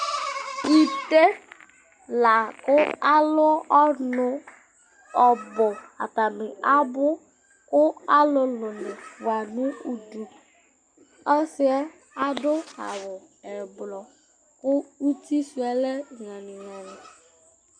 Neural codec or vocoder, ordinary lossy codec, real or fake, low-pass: none; Opus, 64 kbps; real; 9.9 kHz